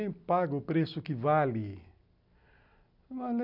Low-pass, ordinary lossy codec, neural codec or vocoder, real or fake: 5.4 kHz; none; none; real